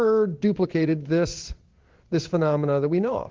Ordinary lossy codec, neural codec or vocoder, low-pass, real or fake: Opus, 16 kbps; codec, 16 kHz in and 24 kHz out, 1 kbps, XY-Tokenizer; 7.2 kHz; fake